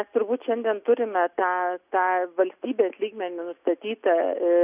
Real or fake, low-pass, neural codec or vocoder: real; 3.6 kHz; none